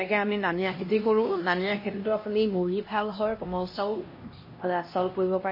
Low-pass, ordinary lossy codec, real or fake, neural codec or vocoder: 5.4 kHz; MP3, 24 kbps; fake; codec, 16 kHz, 1 kbps, X-Codec, HuBERT features, trained on LibriSpeech